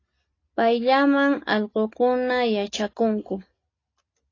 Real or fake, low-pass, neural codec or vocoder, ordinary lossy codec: real; 7.2 kHz; none; AAC, 32 kbps